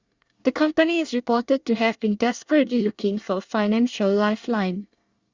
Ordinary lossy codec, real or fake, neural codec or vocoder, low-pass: Opus, 64 kbps; fake; codec, 24 kHz, 1 kbps, SNAC; 7.2 kHz